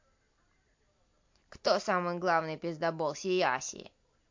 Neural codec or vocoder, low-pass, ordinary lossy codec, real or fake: none; 7.2 kHz; MP3, 48 kbps; real